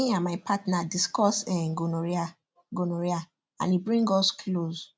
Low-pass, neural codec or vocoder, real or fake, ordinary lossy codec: none; none; real; none